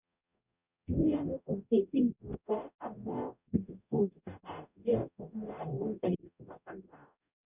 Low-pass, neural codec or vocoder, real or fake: 3.6 kHz; codec, 44.1 kHz, 0.9 kbps, DAC; fake